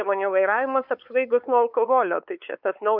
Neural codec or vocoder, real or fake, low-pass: codec, 16 kHz, 4 kbps, X-Codec, WavLM features, trained on Multilingual LibriSpeech; fake; 5.4 kHz